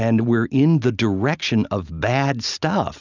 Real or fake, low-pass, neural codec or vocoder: real; 7.2 kHz; none